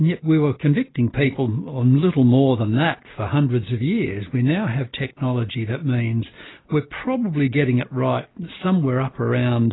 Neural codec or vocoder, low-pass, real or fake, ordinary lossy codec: none; 7.2 kHz; real; AAC, 16 kbps